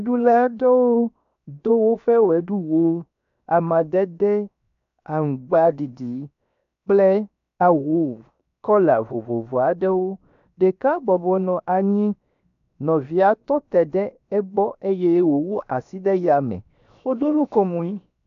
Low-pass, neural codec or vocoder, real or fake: 7.2 kHz; codec, 16 kHz, 0.7 kbps, FocalCodec; fake